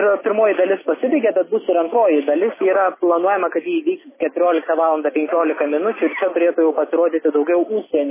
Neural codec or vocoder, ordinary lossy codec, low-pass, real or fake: none; MP3, 16 kbps; 3.6 kHz; real